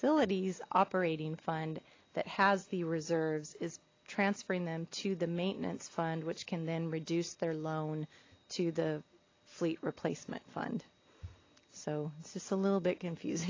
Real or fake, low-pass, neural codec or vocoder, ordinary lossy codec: real; 7.2 kHz; none; AAC, 32 kbps